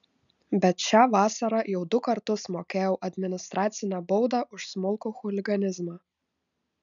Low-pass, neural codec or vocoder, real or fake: 7.2 kHz; none; real